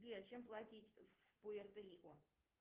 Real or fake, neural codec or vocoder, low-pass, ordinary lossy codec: fake; codec, 16 kHz, 2 kbps, FunCodec, trained on Chinese and English, 25 frames a second; 3.6 kHz; Opus, 16 kbps